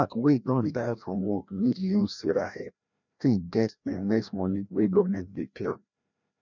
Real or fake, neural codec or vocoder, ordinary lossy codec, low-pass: fake; codec, 16 kHz, 1 kbps, FreqCodec, larger model; none; 7.2 kHz